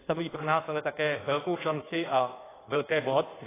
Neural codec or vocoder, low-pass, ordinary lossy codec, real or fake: codec, 16 kHz in and 24 kHz out, 1.1 kbps, FireRedTTS-2 codec; 3.6 kHz; AAC, 16 kbps; fake